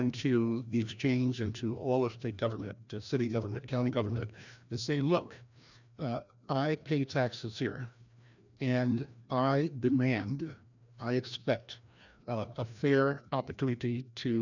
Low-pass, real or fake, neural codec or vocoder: 7.2 kHz; fake; codec, 16 kHz, 1 kbps, FreqCodec, larger model